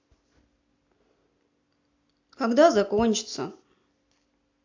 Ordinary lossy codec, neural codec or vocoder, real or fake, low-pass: AAC, 48 kbps; none; real; 7.2 kHz